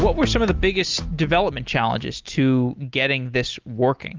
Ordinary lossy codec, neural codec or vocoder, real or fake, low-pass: Opus, 32 kbps; none; real; 7.2 kHz